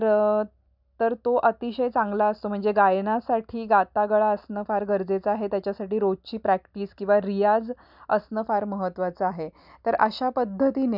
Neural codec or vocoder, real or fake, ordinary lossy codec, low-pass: none; real; none; 5.4 kHz